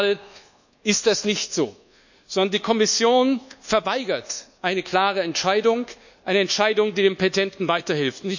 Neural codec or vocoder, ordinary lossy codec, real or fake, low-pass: codec, 24 kHz, 1.2 kbps, DualCodec; none; fake; 7.2 kHz